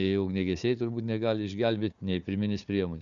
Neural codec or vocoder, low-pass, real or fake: codec, 16 kHz, 4 kbps, FunCodec, trained on Chinese and English, 50 frames a second; 7.2 kHz; fake